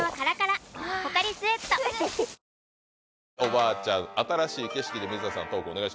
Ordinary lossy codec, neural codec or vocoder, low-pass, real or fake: none; none; none; real